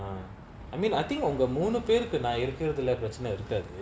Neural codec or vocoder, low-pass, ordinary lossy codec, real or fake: none; none; none; real